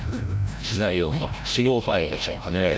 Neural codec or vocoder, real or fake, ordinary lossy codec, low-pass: codec, 16 kHz, 0.5 kbps, FreqCodec, larger model; fake; none; none